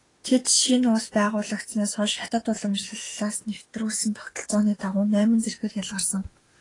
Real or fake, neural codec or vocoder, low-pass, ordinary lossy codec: fake; autoencoder, 48 kHz, 32 numbers a frame, DAC-VAE, trained on Japanese speech; 10.8 kHz; AAC, 32 kbps